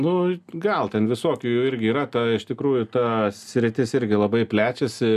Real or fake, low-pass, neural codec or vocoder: real; 14.4 kHz; none